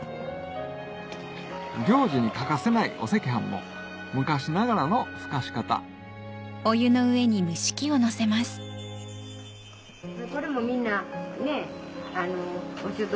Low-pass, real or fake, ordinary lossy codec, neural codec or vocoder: none; real; none; none